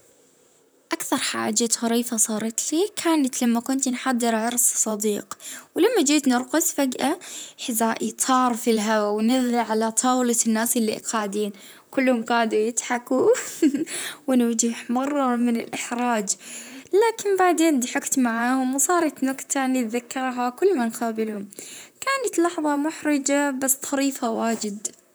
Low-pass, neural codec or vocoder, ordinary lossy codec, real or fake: none; vocoder, 44.1 kHz, 128 mel bands, Pupu-Vocoder; none; fake